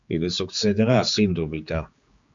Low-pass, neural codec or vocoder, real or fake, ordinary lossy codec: 7.2 kHz; codec, 16 kHz, 4 kbps, X-Codec, HuBERT features, trained on general audio; fake; Opus, 64 kbps